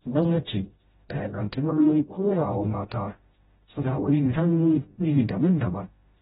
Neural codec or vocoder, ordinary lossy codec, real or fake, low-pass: codec, 16 kHz, 0.5 kbps, FreqCodec, smaller model; AAC, 16 kbps; fake; 7.2 kHz